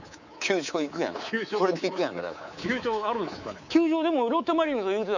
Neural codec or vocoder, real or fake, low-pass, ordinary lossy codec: codec, 24 kHz, 3.1 kbps, DualCodec; fake; 7.2 kHz; none